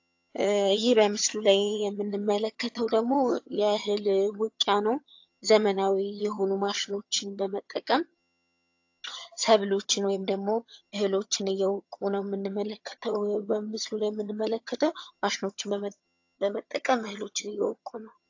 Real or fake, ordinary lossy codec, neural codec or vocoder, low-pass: fake; AAC, 48 kbps; vocoder, 22.05 kHz, 80 mel bands, HiFi-GAN; 7.2 kHz